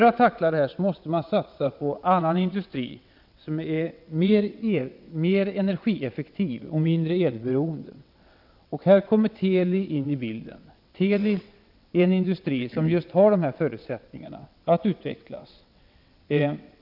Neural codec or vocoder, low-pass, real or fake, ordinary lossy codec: vocoder, 22.05 kHz, 80 mel bands, WaveNeXt; 5.4 kHz; fake; none